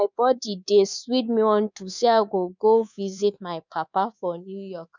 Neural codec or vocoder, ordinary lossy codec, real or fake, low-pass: codec, 24 kHz, 3.1 kbps, DualCodec; none; fake; 7.2 kHz